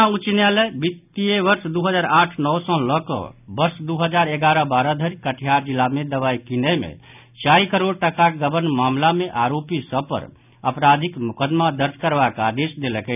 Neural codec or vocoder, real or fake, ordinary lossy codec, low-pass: none; real; none; 3.6 kHz